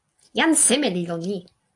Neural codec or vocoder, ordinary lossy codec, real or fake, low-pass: none; AAC, 48 kbps; real; 10.8 kHz